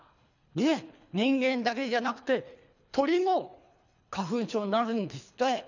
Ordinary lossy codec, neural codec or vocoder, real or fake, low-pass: none; codec, 24 kHz, 3 kbps, HILCodec; fake; 7.2 kHz